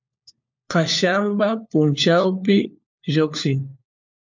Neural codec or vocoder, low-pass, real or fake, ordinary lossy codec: codec, 16 kHz, 4 kbps, FunCodec, trained on LibriTTS, 50 frames a second; 7.2 kHz; fake; MP3, 64 kbps